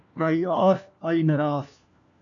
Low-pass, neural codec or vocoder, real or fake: 7.2 kHz; codec, 16 kHz, 1 kbps, FunCodec, trained on LibriTTS, 50 frames a second; fake